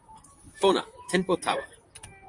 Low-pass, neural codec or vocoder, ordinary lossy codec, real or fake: 10.8 kHz; vocoder, 44.1 kHz, 128 mel bands, Pupu-Vocoder; AAC, 64 kbps; fake